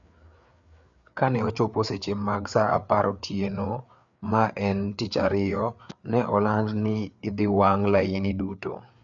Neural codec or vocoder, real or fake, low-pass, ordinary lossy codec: codec, 16 kHz, 4 kbps, FreqCodec, larger model; fake; 7.2 kHz; none